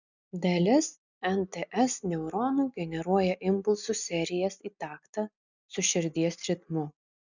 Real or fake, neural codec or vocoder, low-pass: real; none; 7.2 kHz